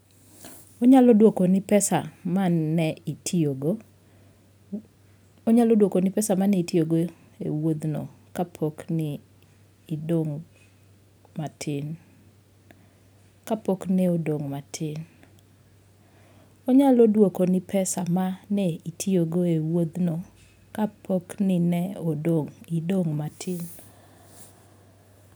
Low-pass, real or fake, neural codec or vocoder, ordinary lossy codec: none; real; none; none